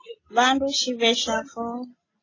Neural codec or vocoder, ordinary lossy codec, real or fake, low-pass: none; AAC, 32 kbps; real; 7.2 kHz